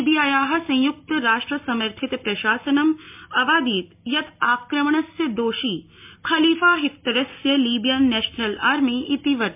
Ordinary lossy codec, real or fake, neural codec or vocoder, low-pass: MP3, 32 kbps; real; none; 3.6 kHz